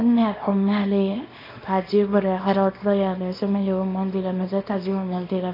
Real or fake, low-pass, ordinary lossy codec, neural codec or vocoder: fake; 5.4 kHz; AAC, 24 kbps; codec, 24 kHz, 0.9 kbps, WavTokenizer, small release